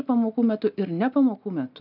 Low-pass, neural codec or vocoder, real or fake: 5.4 kHz; none; real